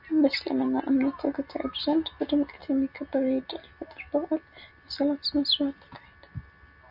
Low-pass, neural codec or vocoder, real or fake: 5.4 kHz; none; real